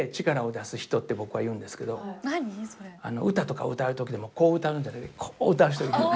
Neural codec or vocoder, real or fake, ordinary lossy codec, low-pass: none; real; none; none